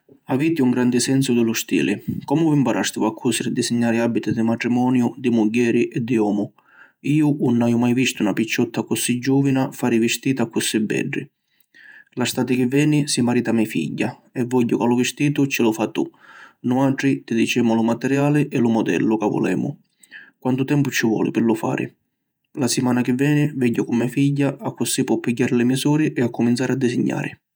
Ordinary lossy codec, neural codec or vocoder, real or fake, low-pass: none; vocoder, 48 kHz, 128 mel bands, Vocos; fake; none